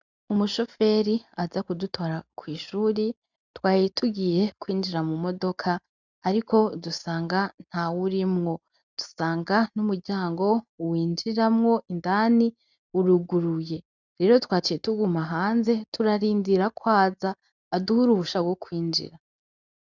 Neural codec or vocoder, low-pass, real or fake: none; 7.2 kHz; real